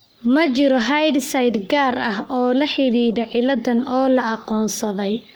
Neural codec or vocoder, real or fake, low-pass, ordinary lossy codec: codec, 44.1 kHz, 3.4 kbps, Pupu-Codec; fake; none; none